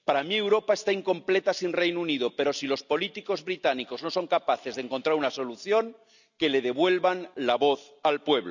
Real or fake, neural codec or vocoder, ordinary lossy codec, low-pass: real; none; none; 7.2 kHz